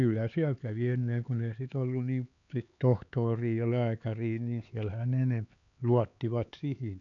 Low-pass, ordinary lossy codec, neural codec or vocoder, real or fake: 7.2 kHz; none; codec, 16 kHz, 4 kbps, X-Codec, HuBERT features, trained on LibriSpeech; fake